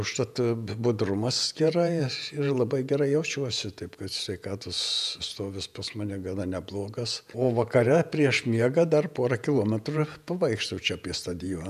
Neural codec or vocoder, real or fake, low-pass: vocoder, 48 kHz, 128 mel bands, Vocos; fake; 14.4 kHz